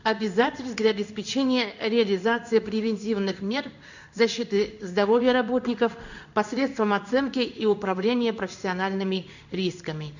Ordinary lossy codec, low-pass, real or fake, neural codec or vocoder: none; 7.2 kHz; fake; codec, 16 kHz in and 24 kHz out, 1 kbps, XY-Tokenizer